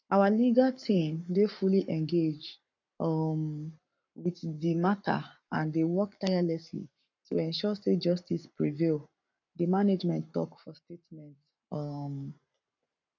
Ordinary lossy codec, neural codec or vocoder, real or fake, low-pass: none; codec, 44.1 kHz, 7.8 kbps, Pupu-Codec; fake; 7.2 kHz